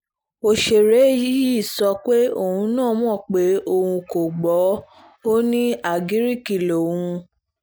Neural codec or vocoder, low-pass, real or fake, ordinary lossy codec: none; none; real; none